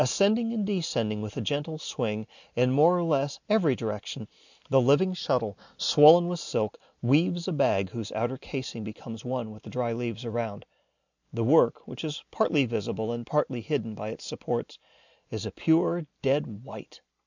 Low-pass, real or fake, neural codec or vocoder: 7.2 kHz; real; none